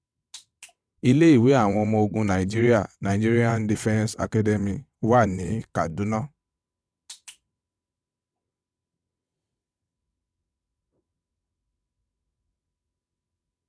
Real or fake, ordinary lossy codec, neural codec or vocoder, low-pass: fake; none; vocoder, 22.05 kHz, 80 mel bands, WaveNeXt; none